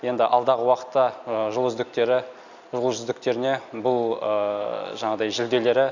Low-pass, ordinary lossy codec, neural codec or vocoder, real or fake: 7.2 kHz; none; none; real